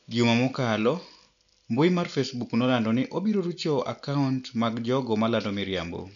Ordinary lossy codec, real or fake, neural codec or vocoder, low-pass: none; real; none; 7.2 kHz